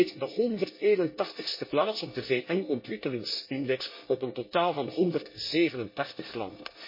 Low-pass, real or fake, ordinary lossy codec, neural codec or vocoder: 5.4 kHz; fake; MP3, 24 kbps; codec, 24 kHz, 1 kbps, SNAC